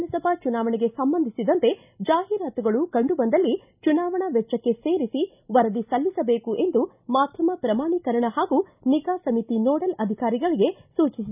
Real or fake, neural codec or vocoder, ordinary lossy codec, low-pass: real; none; none; 3.6 kHz